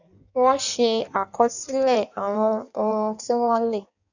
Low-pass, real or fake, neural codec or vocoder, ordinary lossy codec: 7.2 kHz; fake; codec, 16 kHz in and 24 kHz out, 1.1 kbps, FireRedTTS-2 codec; none